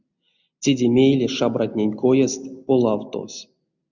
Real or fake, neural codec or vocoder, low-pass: real; none; 7.2 kHz